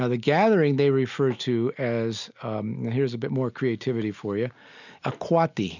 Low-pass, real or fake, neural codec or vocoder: 7.2 kHz; real; none